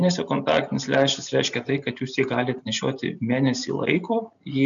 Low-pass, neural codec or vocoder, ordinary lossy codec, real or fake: 7.2 kHz; none; MP3, 96 kbps; real